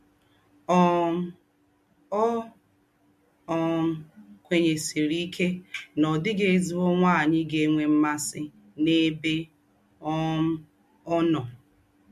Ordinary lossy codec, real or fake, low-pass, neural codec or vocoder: MP3, 64 kbps; real; 14.4 kHz; none